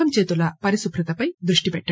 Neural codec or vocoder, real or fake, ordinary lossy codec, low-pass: none; real; none; none